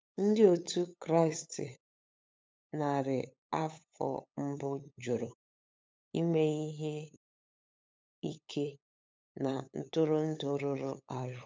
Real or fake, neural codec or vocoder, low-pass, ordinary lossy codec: fake; codec, 16 kHz, 8 kbps, FunCodec, trained on LibriTTS, 25 frames a second; none; none